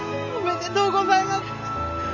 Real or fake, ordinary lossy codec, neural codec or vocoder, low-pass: real; none; none; 7.2 kHz